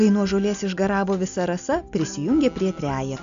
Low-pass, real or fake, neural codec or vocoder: 7.2 kHz; real; none